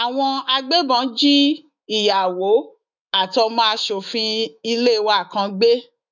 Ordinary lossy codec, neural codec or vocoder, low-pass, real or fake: none; autoencoder, 48 kHz, 128 numbers a frame, DAC-VAE, trained on Japanese speech; 7.2 kHz; fake